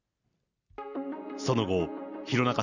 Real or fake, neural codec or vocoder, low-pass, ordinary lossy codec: real; none; 7.2 kHz; none